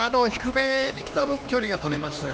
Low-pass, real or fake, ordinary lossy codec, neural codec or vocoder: none; fake; none; codec, 16 kHz, 2 kbps, X-Codec, HuBERT features, trained on LibriSpeech